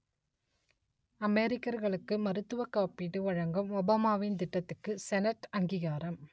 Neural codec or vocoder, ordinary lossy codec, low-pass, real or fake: none; none; none; real